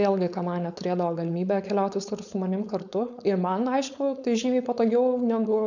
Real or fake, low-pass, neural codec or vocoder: fake; 7.2 kHz; codec, 16 kHz, 4.8 kbps, FACodec